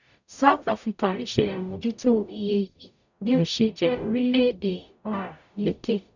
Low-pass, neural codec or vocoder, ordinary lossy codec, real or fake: 7.2 kHz; codec, 44.1 kHz, 0.9 kbps, DAC; none; fake